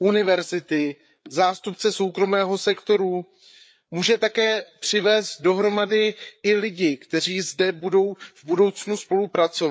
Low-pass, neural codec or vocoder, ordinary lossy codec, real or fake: none; codec, 16 kHz, 4 kbps, FreqCodec, larger model; none; fake